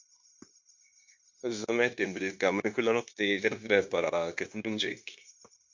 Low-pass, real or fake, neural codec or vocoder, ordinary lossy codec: 7.2 kHz; fake; codec, 16 kHz, 0.9 kbps, LongCat-Audio-Codec; MP3, 48 kbps